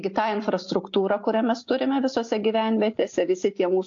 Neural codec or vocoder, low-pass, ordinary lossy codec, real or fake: none; 7.2 kHz; AAC, 48 kbps; real